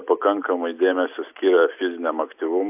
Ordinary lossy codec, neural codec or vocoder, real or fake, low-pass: AAC, 32 kbps; none; real; 3.6 kHz